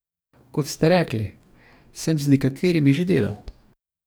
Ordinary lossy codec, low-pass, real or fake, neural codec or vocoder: none; none; fake; codec, 44.1 kHz, 2.6 kbps, DAC